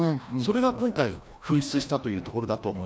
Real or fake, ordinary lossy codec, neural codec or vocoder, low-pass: fake; none; codec, 16 kHz, 1 kbps, FreqCodec, larger model; none